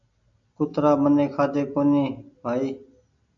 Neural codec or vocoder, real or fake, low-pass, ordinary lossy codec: none; real; 7.2 kHz; AAC, 64 kbps